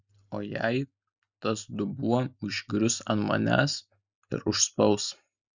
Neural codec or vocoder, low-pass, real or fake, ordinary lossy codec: none; 7.2 kHz; real; Opus, 64 kbps